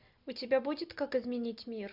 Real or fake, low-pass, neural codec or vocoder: real; 5.4 kHz; none